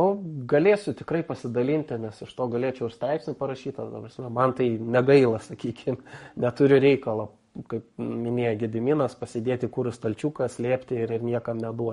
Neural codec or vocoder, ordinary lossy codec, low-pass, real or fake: codec, 44.1 kHz, 7.8 kbps, Pupu-Codec; MP3, 48 kbps; 19.8 kHz; fake